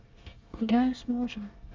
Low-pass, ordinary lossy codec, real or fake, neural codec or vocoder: 7.2 kHz; Opus, 32 kbps; fake; codec, 24 kHz, 1 kbps, SNAC